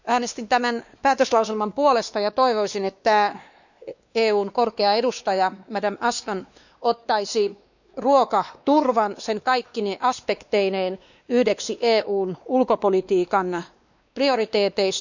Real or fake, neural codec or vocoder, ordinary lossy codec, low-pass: fake; codec, 16 kHz, 2 kbps, X-Codec, WavLM features, trained on Multilingual LibriSpeech; none; 7.2 kHz